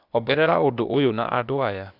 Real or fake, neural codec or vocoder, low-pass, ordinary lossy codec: fake; codec, 16 kHz, 0.8 kbps, ZipCodec; 5.4 kHz; none